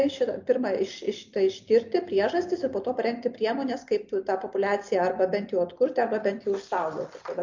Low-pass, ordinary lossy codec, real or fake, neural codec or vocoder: 7.2 kHz; MP3, 48 kbps; real; none